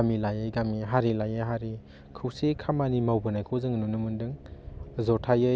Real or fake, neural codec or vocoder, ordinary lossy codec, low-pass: real; none; none; none